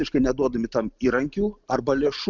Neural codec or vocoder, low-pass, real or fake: none; 7.2 kHz; real